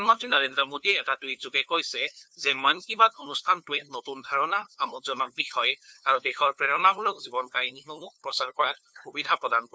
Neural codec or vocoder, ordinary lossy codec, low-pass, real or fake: codec, 16 kHz, 2 kbps, FunCodec, trained on LibriTTS, 25 frames a second; none; none; fake